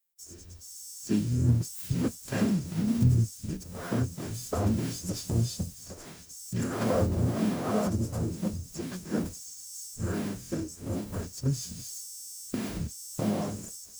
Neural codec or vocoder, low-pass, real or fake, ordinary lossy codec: codec, 44.1 kHz, 0.9 kbps, DAC; none; fake; none